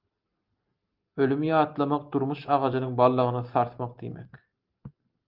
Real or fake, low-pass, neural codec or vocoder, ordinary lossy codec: real; 5.4 kHz; none; Opus, 32 kbps